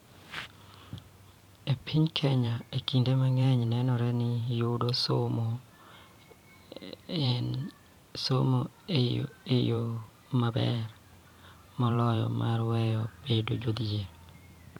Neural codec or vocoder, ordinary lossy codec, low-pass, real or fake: vocoder, 44.1 kHz, 128 mel bands every 512 samples, BigVGAN v2; none; 19.8 kHz; fake